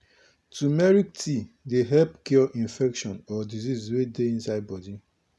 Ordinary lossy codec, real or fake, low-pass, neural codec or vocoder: none; real; none; none